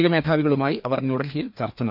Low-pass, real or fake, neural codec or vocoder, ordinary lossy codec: 5.4 kHz; fake; codec, 16 kHz, 2 kbps, FreqCodec, larger model; none